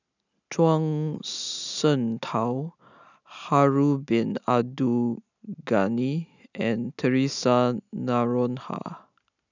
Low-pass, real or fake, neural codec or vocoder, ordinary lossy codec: 7.2 kHz; real; none; none